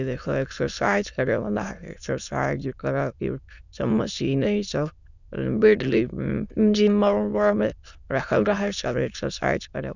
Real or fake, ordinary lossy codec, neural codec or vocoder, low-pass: fake; none; autoencoder, 22.05 kHz, a latent of 192 numbers a frame, VITS, trained on many speakers; 7.2 kHz